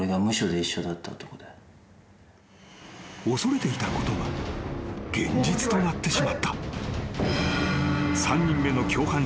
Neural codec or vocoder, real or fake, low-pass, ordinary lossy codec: none; real; none; none